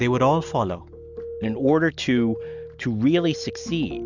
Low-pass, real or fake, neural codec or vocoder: 7.2 kHz; real; none